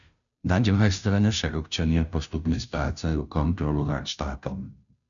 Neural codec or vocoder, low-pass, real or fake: codec, 16 kHz, 0.5 kbps, FunCodec, trained on Chinese and English, 25 frames a second; 7.2 kHz; fake